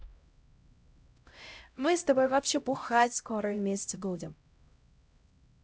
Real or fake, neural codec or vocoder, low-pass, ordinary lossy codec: fake; codec, 16 kHz, 0.5 kbps, X-Codec, HuBERT features, trained on LibriSpeech; none; none